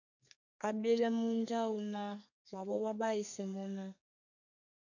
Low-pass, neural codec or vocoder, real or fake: 7.2 kHz; codec, 32 kHz, 1.9 kbps, SNAC; fake